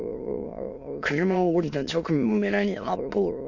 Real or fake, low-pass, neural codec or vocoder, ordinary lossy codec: fake; 7.2 kHz; autoencoder, 22.05 kHz, a latent of 192 numbers a frame, VITS, trained on many speakers; none